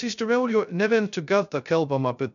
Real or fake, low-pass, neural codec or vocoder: fake; 7.2 kHz; codec, 16 kHz, 0.2 kbps, FocalCodec